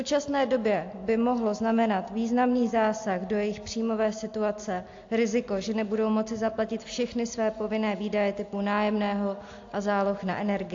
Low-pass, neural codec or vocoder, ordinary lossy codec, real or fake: 7.2 kHz; none; AAC, 48 kbps; real